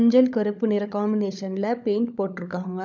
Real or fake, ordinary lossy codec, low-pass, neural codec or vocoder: fake; none; 7.2 kHz; codec, 16 kHz, 16 kbps, FunCodec, trained on LibriTTS, 50 frames a second